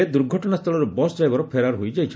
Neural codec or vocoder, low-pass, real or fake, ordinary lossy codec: none; none; real; none